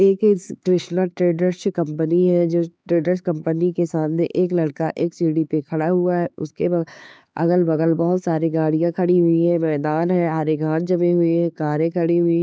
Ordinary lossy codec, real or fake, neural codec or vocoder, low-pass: none; fake; codec, 16 kHz, 4 kbps, X-Codec, HuBERT features, trained on LibriSpeech; none